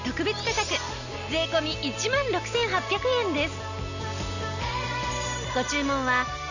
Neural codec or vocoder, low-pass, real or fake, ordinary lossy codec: none; 7.2 kHz; real; none